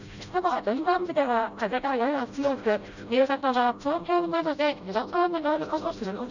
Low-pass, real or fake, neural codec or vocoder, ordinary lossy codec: 7.2 kHz; fake; codec, 16 kHz, 0.5 kbps, FreqCodec, smaller model; none